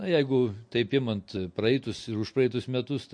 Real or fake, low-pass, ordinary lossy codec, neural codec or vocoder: real; 9.9 kHz; MP3, 48 kbps; none